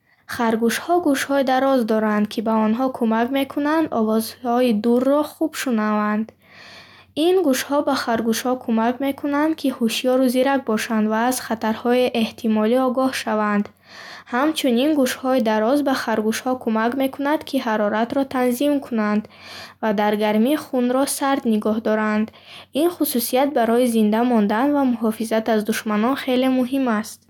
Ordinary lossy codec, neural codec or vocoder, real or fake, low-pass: none; none; real; 19.8 kHz